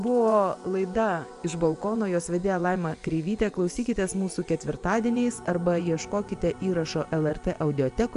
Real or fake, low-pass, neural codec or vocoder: fake; 10.8 kHz; vocoder, 24 kHz, 100 mel bands, Vocos